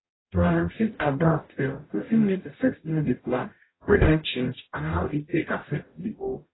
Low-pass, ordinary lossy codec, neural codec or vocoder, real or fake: 7.2 kHz; AAC, 16 kbps; codec, 44.1 kHz, 0.9 kbps, DAC; fake